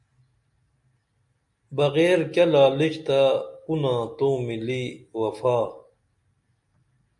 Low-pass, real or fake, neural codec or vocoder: 10.8 kHz; real; none